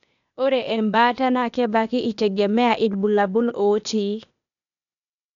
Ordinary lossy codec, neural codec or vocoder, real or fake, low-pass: none; codec, 16 kHz, 0.8 kbps, ZipCodec; fake; 7.2 kHz